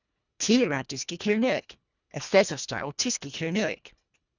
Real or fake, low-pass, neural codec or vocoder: fake; 7.2 kHz; codec, 24 kHz, 1.5 kbps, HILCodec